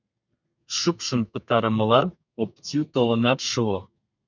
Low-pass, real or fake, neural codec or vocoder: 7.2 kHz; fake; codec, 32 kHz, 1.9 kbps, SNAC